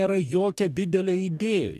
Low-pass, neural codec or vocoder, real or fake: 14.4 kHz; codec, 44.1 kHz, 2.6 kbps, DAC; fake